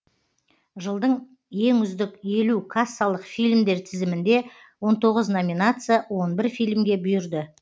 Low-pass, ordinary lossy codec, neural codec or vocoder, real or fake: none; none; none; real